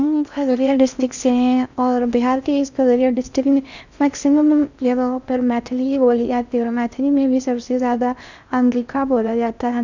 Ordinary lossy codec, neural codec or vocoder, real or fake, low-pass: none; codec, 16 kHz in and 24 kHz out, 0.6 kbps, FocalCodec, streaming, 4096 codes; fake; 7.2 kHz